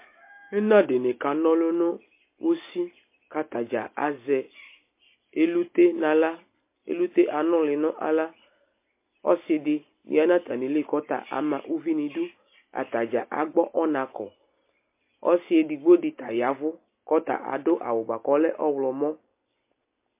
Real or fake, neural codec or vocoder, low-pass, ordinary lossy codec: real; none; 3.6 kHz; MP3, 24 kbps